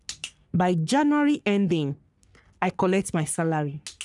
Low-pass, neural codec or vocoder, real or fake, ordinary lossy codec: 10.8 kHz; codec, 44.1 kHz, 3.4 kbps, Pupu-Codec; fake; none